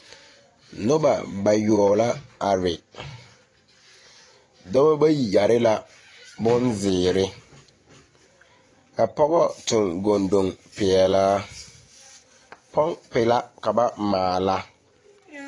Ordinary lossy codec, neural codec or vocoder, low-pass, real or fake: AAC, 32 kbps; vocoder, 24 kHz, 100 mel bands, Vocos; 10.8 kHz; fake